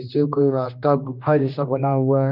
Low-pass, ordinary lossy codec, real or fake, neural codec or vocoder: 5.4 kHz; none; fake; codec, 16 kHz, 1 kbps, X-Codec, HuBERT features, trained on general audio